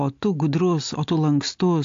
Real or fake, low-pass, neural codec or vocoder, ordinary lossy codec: real; 7.2 kHz; none; AAC, 48 kbps